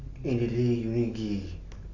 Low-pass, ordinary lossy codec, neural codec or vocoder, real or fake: 7.2 kHz; none; none; real